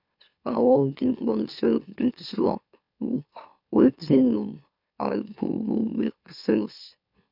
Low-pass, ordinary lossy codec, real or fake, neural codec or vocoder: 5.4 kHz; none; fake; autoencoder, 44.1 kHz, a latent of 192 numbers a frame, MeloTTS